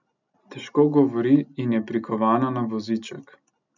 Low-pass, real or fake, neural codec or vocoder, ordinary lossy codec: 7.2 kHz; real; none; none